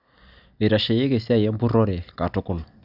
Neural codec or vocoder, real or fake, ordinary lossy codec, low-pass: none; real; none; 5.4 kHz